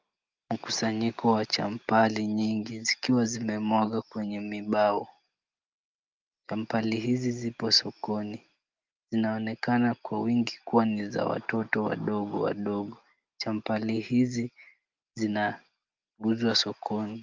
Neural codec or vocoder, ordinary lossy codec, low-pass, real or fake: none; Opus, 32 kbps; 7.2 kHz; real